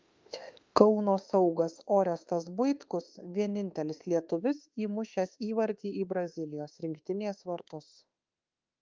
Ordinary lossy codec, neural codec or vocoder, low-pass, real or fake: Opus, 24 kbps; autoencoder, 48 kHz, 32 numbers a frame, DAC-VAE, trained on Japanese speech; 7.2 kHz; fake